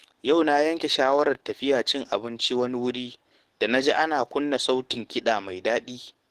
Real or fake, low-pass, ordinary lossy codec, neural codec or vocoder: fake; 14.4 kHz; Opus, 16 kbps; codec, 44.1 kHz, 7.8 kbps, DAC